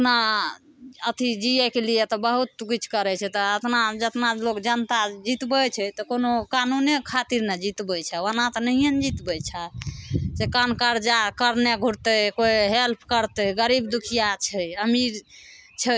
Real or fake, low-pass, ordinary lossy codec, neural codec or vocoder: real; none; none; none